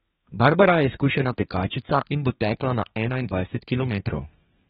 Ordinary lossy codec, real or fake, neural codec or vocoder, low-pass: AAC, 16 kbps; fake; codec, 32 kHz, 1.9 kbps, SNAC; 14.4 kHz